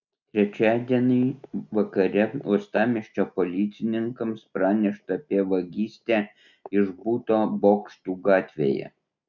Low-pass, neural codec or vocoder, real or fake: 7.2 kHz; none; real